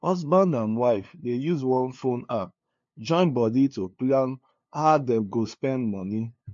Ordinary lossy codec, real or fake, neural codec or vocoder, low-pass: MP3, 48 kbps; fake; codec, 16 kHz, 2 kbps, FunCodec, trained on LibriTTS, 25 frames a second; 7.2 kHz